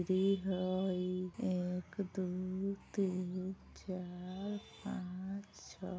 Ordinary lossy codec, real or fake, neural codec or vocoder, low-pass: none; real; none; none